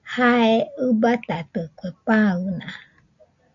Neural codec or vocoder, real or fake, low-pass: none; real; 7.2 kHz